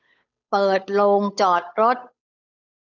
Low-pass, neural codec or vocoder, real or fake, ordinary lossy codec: 7.2 kHz; codec, 16 kHz, 8 kbps, FunCodec, trained on Chinese and English, 25 frames a second; fake; none